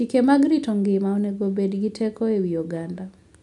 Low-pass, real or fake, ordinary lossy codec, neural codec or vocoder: 10.8 kHz; real; none; none